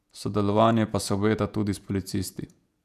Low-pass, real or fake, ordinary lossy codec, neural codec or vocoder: 14.4 kHz; fake; none; vocoder, 48 kHz, 128 mel bands, Vocos